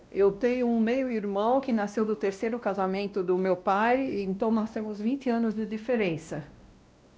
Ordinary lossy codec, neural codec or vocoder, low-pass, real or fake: none; codec, 16 kHz, 1 kbps, X-Codec, WavLM features, trained on Multilingual LibriSpeech; none; fake